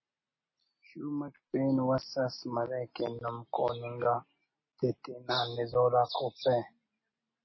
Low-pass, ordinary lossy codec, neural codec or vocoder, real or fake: 7.2 kHz; MP3, 24 kbps; none; real